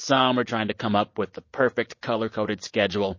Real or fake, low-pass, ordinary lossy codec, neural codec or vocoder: real; 7.2 kHz; MP3, 32 kbps; none